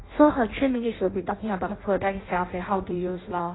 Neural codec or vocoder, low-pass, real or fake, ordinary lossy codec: codec, 16 kHz in and 24 kHz out, 0.6 kbps, FireRedTTS-2 codec; 7.2 kHz; fake; AAC, 16 kbps